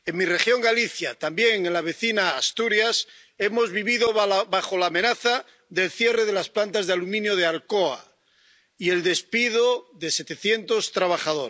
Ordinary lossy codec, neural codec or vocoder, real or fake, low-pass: none; none; real; none